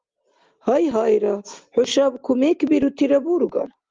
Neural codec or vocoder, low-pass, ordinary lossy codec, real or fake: none; 7.2 kHz; Opus, 32 kbps; real